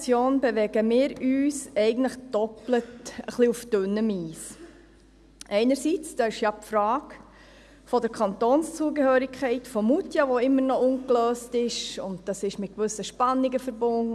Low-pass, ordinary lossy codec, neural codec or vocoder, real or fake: none; none; none; real